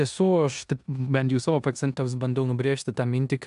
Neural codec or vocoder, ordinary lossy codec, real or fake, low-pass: codec, 16 kHz in and 24 kHz out, 0.9 kbps, LongCat-Audio-Codec, four codebook decoder; AAC, 96 kbps; fake; 10.8 kHz